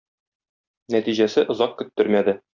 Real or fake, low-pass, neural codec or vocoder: real; 7.2 kHz; none